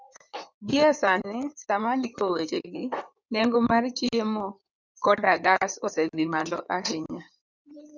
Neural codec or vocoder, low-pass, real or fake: codec, 16 kHz in and 24 kHz out, 2.2 kbps, FireRedTTS-2 codec; 7.2 kHz; fake